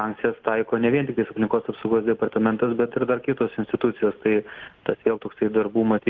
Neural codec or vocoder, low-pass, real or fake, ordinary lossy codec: none; 7.2 kHz; real; Opus, 32 kbps